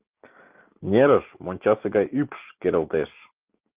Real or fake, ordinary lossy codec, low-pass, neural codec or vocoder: real; Opus, 24 kbps; 3.6 kHz; none